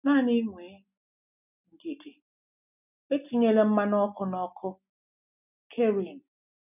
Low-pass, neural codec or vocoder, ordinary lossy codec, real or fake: 3.6 kHz; none; none; real